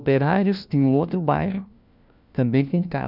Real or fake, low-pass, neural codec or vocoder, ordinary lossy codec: fake; 5.4 kHz; codec, 16 kHz, 1 kbps, FunCodec, trained on LibriTTS, 50 frames a second; none